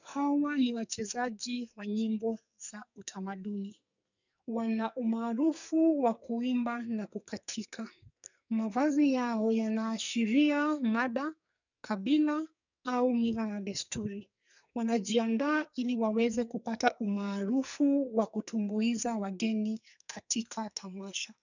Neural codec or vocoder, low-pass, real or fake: codec, 44.1 kHz, 2.6 kbps, SNAC; 7.2 kHz; fake